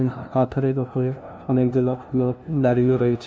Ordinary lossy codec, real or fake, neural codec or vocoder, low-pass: none; fake; codec, 16 kHz, 0.5 kbps, FunCodec, trained on LibriTTS, 25 frames a second; none